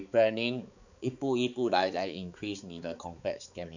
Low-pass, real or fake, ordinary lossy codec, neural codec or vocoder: 7.2 kHz; fake; none; codec, 16 kHz, 4 kbps, X-Codec, HuBERT features, trained on balanced general audio